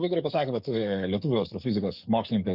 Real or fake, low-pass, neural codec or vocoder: fake; 5.4 kHz; codec, 44.1 kHz, 7.8 kbps, DAC